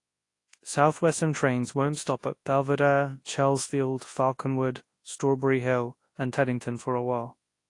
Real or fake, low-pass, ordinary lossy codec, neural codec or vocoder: fake; 10.8 kHz; AAC, 48 kbps; codec, 24 kHz, 0.9 kbps, WavTokenizer, large speech release